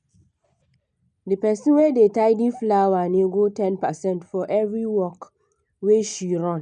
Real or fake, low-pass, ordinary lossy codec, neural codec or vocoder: real; 9.9 kHz; none; none